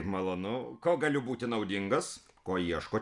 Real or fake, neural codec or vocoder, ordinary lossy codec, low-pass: real; none; Opus, 64 kbps; 10.8 kHz